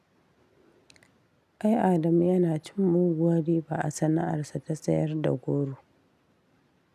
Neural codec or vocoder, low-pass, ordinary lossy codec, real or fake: none; 14.4 kHz; none; real